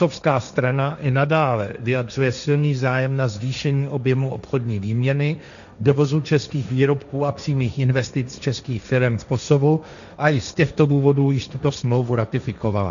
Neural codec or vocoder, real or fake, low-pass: codec, 16 kHz, 1.1 kbps, Voila-Tokenizer; fake; 7.2 kHz